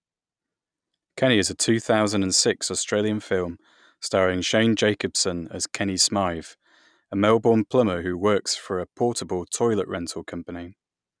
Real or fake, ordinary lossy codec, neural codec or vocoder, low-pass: real; none; none; 9.9 kHz